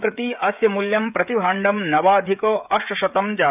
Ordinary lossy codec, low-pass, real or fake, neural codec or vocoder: none; 3.6 kHz; fake; codec, 16 kHz, 16 kbps, FreqCodec, smaller model